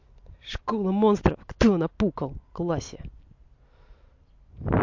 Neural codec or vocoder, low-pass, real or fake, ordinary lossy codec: none; 7.2 kHz; real; MP3, 48 kbps